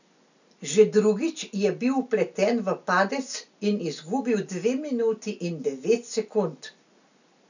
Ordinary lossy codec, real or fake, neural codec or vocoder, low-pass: none; real; none; 7.2 kHz